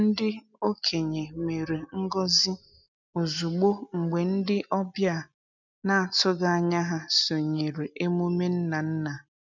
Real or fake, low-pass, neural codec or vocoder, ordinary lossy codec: real; 7.2 kHz; none; none